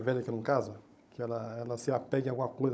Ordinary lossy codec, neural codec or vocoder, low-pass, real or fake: none; codec, 16 kHz, 16 kbps, FunCodec, trained on Chinese and English, 50 frames a second; none; fake